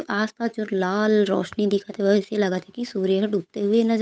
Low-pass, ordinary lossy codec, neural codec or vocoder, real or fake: none; none; codec, 16 kHz, 8 kbps, FunCodec, trained on Chinese and English, 25 frames a second; fake